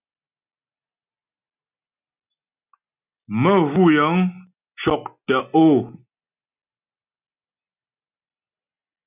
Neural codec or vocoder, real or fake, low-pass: none; real; 3.6 kHz